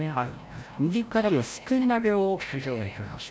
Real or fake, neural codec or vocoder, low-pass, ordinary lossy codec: fake; codec, 16 kHz, 0.5 kbps, FreqCodec, larger model; none; none